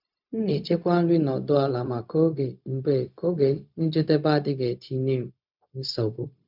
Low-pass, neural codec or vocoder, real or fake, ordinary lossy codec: 5.4 kHz; codec, 16 kHz, 0.4 kbps, LongCat-Audio-Codec; fake; none